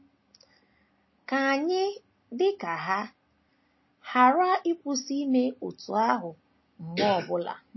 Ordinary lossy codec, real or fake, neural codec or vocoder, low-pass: MP3, 24 kbps; real; none; 7.2 kHz